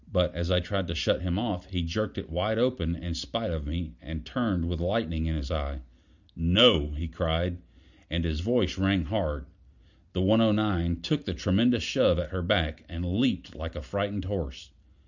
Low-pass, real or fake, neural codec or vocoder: 7.2 kHz; real; none